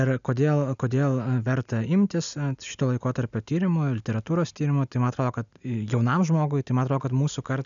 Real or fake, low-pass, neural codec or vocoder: real; 7.2 kHz; none